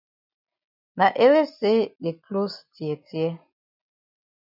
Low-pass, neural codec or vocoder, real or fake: 5.4 kHz; none; real